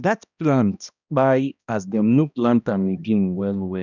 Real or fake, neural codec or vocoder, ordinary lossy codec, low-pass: fake; codec, 16 kHz, 1 kbps, X-Codec, HuBERT features, trained on balanced general audio; none; 7.2 kHz